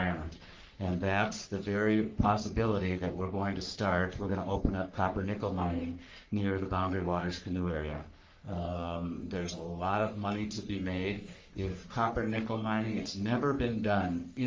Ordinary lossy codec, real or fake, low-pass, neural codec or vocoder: Opus, 32 kbps; fake; 7.2 kHz; codec, 44.1 kHz, 3.4 kbps, Pupu-Codec